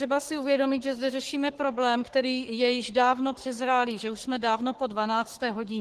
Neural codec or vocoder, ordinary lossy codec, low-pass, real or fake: codec, 44.1 kHz, 3.4 kbps, Pupu-Codec; Opus, 16 kbps; 14.4 kHz; fake